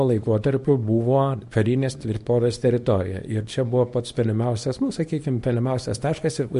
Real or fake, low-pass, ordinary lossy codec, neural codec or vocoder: fake; 10.8 kHz; MP3, 48 kbps; codec, 24 kHz, 0.9 kbps, WavTokenizer, small release